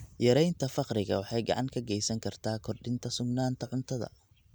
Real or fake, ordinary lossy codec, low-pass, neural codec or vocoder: real; none; none; none